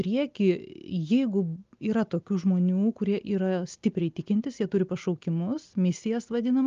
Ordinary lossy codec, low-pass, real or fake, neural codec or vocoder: Opus, 32 kbps; 7.2 kHz; real; none